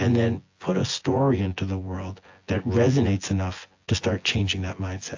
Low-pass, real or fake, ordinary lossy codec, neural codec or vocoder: 7.2 kHz; fake; AAC, 48 kbps; vocoder, 24 kHz, 100 mel bands, Vocos